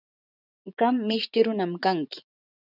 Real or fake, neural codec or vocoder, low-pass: fake; vocoder, 24 kHz, 100 mel bands, Vocos; 5.4 kHz